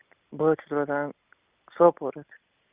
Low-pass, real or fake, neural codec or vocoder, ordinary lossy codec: 3.6 kHz; real; none; Opus, 64 kbps